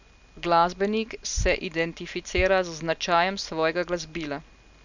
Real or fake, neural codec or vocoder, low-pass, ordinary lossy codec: real; none; 7.2 kHz; none